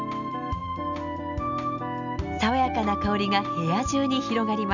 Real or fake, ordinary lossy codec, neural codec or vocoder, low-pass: real; none; none; 7.2 kHz